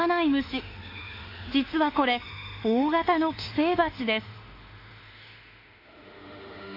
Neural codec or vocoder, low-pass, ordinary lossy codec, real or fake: autoencoder, 48 kHz, 32 numbers a frame, DAC-VAE, trained on Japanese speech; 5.4 kHz; AAC, 32 kbps; fake